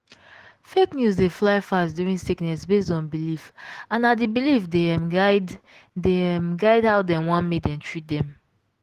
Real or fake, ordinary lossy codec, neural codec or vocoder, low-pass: fake; Opus, 16 kbps; autoencoder, 48 kHz, 128 numbers a frame, DAC-VAE, trained on Japanese speech; 14.4 kHz